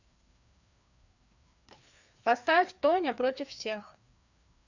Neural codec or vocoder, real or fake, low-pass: codec, 16 kHz, 2 kbps, FreqCodec, larger model; fake; 7.2 kHz